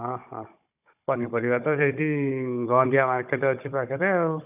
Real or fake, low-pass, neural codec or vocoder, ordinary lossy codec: fake; 3.6 kHz; codec, 16 kHz, 16 kbps, FunCodec, trained on Chinese and English, 50 frames a second; none